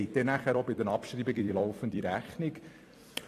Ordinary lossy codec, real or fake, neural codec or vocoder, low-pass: AAC, 48 kbps; fake; vocoder, 44.1 kHz, 128 mel bands every 256 samples, BigVGAN v2; 14.4 kHz